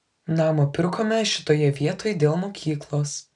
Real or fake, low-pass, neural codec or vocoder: real; 10.8 kHz; none